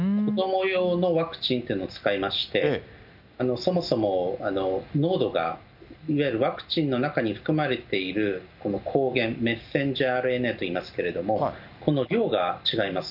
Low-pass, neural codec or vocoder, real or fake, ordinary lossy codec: 5.4 kHz; none; real; none